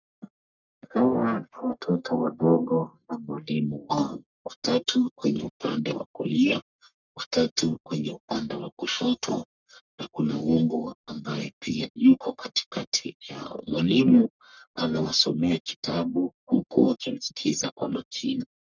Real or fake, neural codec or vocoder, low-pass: fake; codec, 44.1 kHz, 1.7 kbps, Pupu-Codec; 7.2 kHz